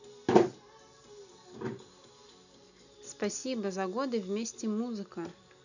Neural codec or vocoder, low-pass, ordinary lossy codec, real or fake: none; 7.2 kHz; none; real